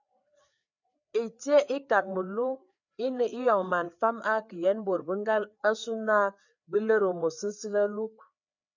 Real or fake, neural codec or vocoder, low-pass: fake; codec, 16 kHz, 4 kbps, FreqCodec, larger model; 7.2 kHz